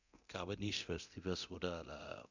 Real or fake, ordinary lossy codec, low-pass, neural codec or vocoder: fake; none; 7.2 kHz; codec, 24 kHz, 0.9 kbps, DualCodec